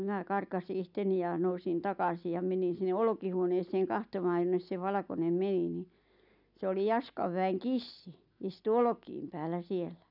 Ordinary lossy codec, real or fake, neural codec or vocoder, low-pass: none; real; none; 5.4 kHz